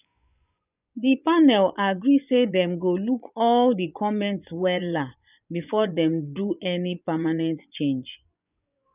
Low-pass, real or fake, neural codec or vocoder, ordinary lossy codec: 3.6 kHz; fake; vocoder, 24 kHz, 100 mel bands, Vocos; none